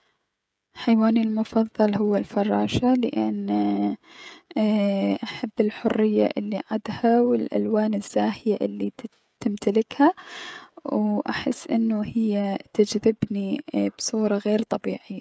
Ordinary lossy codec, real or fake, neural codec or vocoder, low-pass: none; fake; codec, 16 kHz, 16 kbps, FreqCodec, smaller model; none